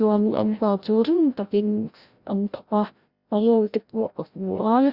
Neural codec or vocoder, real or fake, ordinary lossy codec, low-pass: codec, 16 kHz, 0.5 kbps, FreqCodec, larger model; fake; Opus, 64 kbps; 5.4 kHz